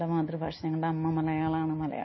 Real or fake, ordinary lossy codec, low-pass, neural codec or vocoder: real; MP3, 24 kbps; 7.2 kHz; none